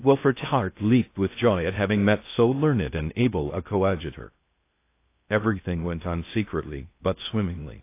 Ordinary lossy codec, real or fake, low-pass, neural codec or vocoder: AAC, 24 kbps; fake; 3.6 kHz; codec, 16 kHz in and 24 kHz out, 0.6 kbps, FocalCodec, streaming, 4096 codes